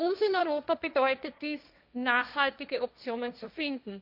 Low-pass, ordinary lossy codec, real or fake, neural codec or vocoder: 5.4 kHz; none; fake; codec, 16 kHz, 1.1 kbps, Voila-Tokenizer